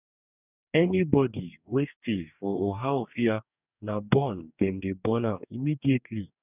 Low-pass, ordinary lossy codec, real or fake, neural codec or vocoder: 3.6 kHz; none; fake; codec, 44.1 kHz, 2.6 kbps, DAC